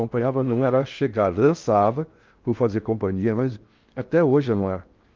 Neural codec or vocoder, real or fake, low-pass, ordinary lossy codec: codec, 16 kHz in and 24 kHz out, 0.6 kbps, FocalCodec, streaming, 4096 codes; fake; 7.2 kHz; Opus, 24 kbps